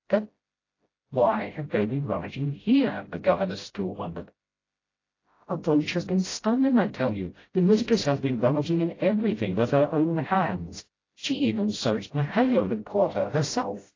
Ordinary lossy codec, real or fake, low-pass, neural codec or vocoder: AAC, 32 kbps; fake; 7.2 kHz; codec, 16 kHz, 0.5 kbps, FreqCodec, smaller model